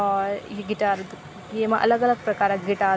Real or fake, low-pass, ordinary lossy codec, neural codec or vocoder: real; none; none; none